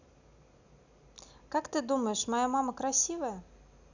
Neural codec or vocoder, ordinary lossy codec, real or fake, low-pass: none; none; real; 7.2 kHz